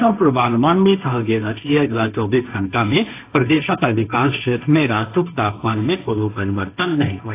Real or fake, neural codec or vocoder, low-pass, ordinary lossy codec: fake; codec, 16 kHz, 1.1 kbps, Voila-Tokenizer; 3.6 kHz; AAC, 24 kbps